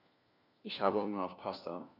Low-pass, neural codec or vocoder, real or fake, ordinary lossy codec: 5.4 kHz; codec, 16 kHz, 1 kbps, FunCodec, trained on LibriTTS, 50 frames a second; fake; none